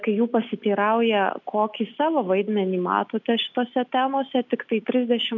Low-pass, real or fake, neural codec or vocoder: 7.2 kHz; real; none